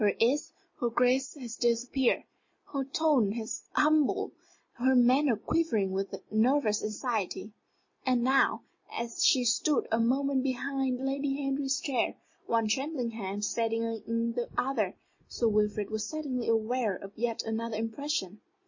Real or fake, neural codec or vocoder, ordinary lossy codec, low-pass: real; none; MP3, 32 kbps; 7.2 kHz